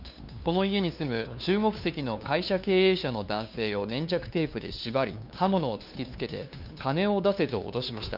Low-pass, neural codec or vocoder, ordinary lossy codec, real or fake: 5.4 kHz; codec, 16 kHz, 2 kbps, FunCodec, trained on LibriTTS, 25 frames a second; none; fake